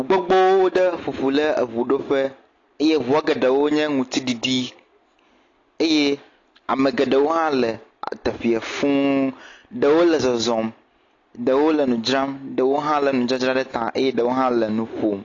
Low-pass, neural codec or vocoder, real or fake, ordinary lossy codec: 7.2 kHz; none; real; AAC, 32 kbps